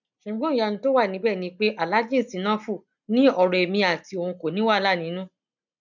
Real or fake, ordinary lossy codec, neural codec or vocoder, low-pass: real; none; none; 7.2 kHz